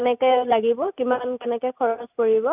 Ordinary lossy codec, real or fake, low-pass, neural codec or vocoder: none; real; 3.6 kHz; none